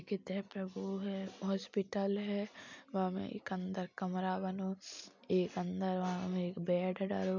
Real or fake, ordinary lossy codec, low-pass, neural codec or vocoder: real; none; 7.2 kHz; none